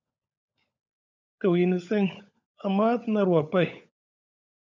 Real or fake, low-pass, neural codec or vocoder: fake; 7.2 kHz; codec, 16 kHz, 16 kbps, FunCodec, trained on LibriTTS, 50 frames a second